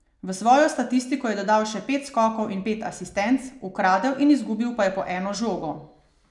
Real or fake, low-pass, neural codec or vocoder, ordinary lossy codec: real; 10.8 kHz; none; none